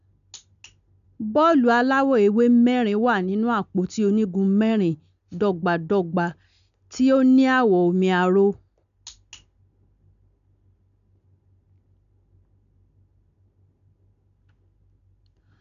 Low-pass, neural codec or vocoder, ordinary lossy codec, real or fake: 7.2 kHz; none; none; real